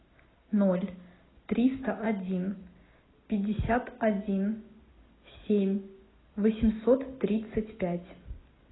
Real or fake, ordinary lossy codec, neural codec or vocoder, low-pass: real; AAC, 16 kbps; none; 7.2 kHz